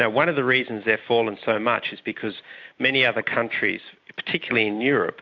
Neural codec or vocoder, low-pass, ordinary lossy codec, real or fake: none; 7.2 kHz; AAC, 48 kbps; real